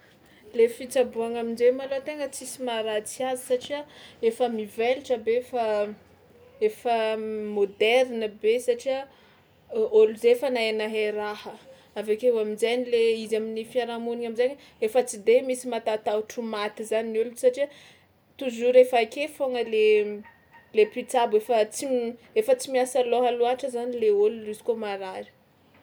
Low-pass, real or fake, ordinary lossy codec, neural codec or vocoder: none; real; none; none